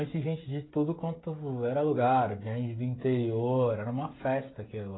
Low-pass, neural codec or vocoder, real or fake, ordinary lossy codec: 7.2 kHz; codec, 16 kHz, 16 kbps, FreqCodec, smaller model; fake; AAC, 16 kbps